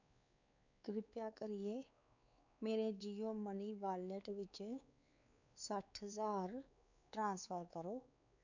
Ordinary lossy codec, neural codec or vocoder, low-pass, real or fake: none; codec, 16 kHz, 4 kbps, X-Codec, WavLM features, trained on Multilingual LibriSpeech; 7.2 kHz; fake